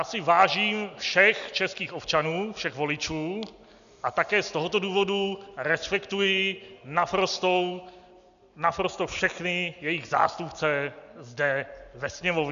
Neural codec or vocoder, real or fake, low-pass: none; real; 7.2 kHz